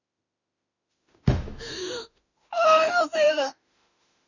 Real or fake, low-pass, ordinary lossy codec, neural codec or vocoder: fake; 7.2 kHz; none; autoencoder, 48 kHz, 32 numbers a frame, DAC-VAE, trained on Japanese speech